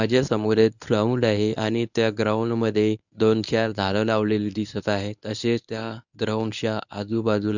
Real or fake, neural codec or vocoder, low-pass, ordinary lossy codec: fake; codec, 24 kHz, 0.9 kbps, WavTokenizer, medium speech release version 1; 7.2 kHz; none